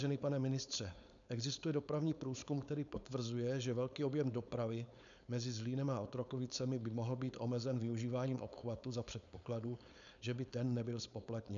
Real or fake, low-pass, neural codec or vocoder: fake; 7.2 kHz; codec, 16 kHz, 4.8 kbps, FACodec